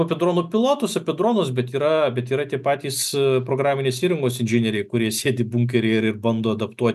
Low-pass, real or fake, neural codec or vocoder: 14.4 kHz; real; none